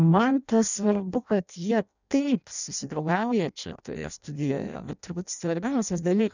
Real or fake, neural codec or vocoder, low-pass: fake; codec, 16 kHz in and 24 kHz out, 0.6 kbps, FireRedTTS-2 codec; 7.2 kHz